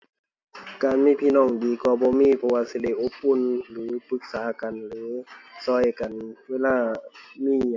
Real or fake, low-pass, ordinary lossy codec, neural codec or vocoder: real; 7.2 kHz; MP3, 48 kbps; none